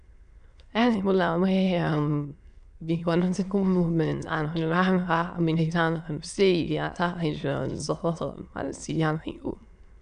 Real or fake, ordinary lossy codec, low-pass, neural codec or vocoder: fake; none; 9.9 kHz; autoencoder, 22.05 kHz, a latent of 192 numbers a frame, VITS, trained on many speakers